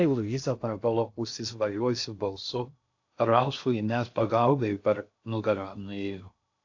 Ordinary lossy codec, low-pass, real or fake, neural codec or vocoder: AAC, 48 kbps; 7.2 kHz; fake; codec, 16 kHz in and 24 kHz out, 0.6 kbps, FocalCodec, streaming, 2048 codes